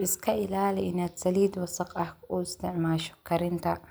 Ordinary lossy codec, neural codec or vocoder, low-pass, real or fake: none; none; none; real